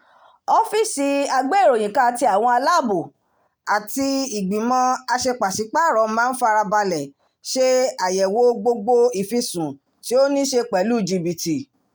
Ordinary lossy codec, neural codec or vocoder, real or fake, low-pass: none; none; real; none